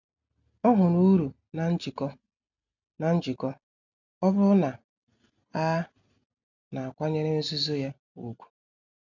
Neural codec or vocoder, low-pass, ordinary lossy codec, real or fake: none; 7.2 kHz; none; real